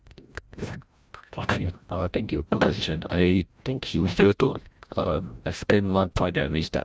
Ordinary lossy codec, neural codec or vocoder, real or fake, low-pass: none; codec, 16 kHz, 0.5 kbps, FreqCodec, larger model; fake; none